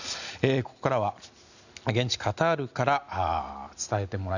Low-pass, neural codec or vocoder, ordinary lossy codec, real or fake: 7.2 kHz; none; none; real